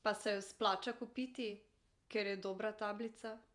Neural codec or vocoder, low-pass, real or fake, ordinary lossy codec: vocoder, 48 kHz, 128 mel bands, Vocos; 10.8 kHz; fake; none